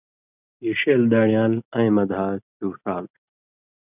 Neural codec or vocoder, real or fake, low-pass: none; real; 3.6 kHz